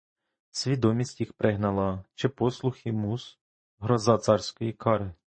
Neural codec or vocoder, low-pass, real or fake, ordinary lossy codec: vocoder, 44.1 kHz, 128 mel bands every 512 samples, BigVGAN v2; 9.9 kHz; fake; MP3, 32 kbps